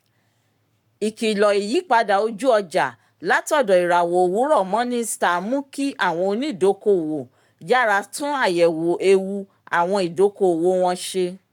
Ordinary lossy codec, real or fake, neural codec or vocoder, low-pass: none; fake; codec, 44.1 kHz, 7.8 kbps, Pupu-Codec; 19.8 kHz